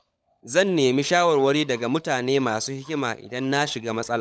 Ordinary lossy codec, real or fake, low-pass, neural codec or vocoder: none; fake; none; codec, 16 kHz, 16 kbps, FunCodec, trained on LibriTTS, 50 frames a second